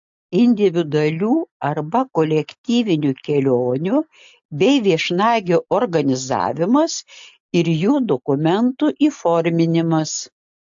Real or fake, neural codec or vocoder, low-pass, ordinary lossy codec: real; none; 7.2 kHz; AAC, 64 kbps